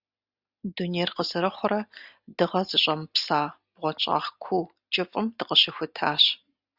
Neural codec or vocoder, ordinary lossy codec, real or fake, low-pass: none; Opus, 64 kbps; real; 5.4 kHz